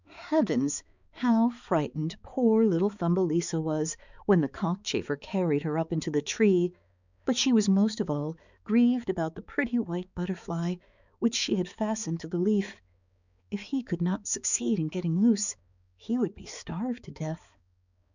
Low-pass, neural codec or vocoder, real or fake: 7.2 kHz; codec, 16 kHz, 4 kbps, X-Codec, HuBERT features, trained on balanced general audio; fake